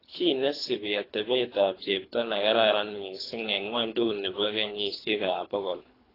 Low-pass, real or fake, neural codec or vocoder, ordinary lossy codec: 5.4 kHz; fake; codec, 24 kHz, 3 kbps, HILCodec; AAC, 24 kbps